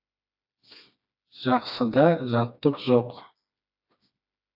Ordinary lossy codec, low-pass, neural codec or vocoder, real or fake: AAC, 48 kbps; 5.4 kHz; codec, 16 kHz, 2 kbps, FreqCodec, smaller model; fake